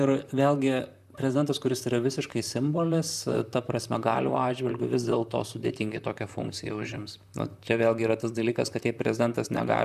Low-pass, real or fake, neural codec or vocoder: 14.4 kHz; fake; vocoder, 44.1 kHz, 128 mel bands, Pupu-Vocoder